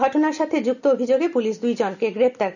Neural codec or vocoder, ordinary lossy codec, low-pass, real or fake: none; none; 7.2 kHz; real